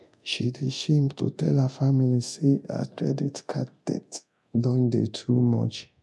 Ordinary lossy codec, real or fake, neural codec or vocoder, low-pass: none; fake; codec, 24 kHz, 0.9 kbps, DualCodec; none